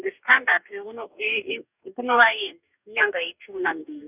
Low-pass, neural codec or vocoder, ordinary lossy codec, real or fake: 3.6 kHz; codec, 44.1 kHz, 2.6 kbps, DAC; none; fake